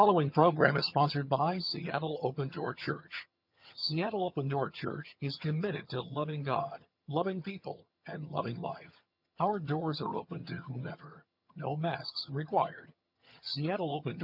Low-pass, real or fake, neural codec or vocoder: 5.4 kHz; fake; vocoder, 22.05 kHz, 80 mel bands, HiFi-GAN